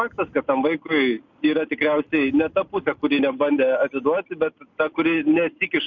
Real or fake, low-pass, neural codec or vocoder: real; 7.2 kHz; none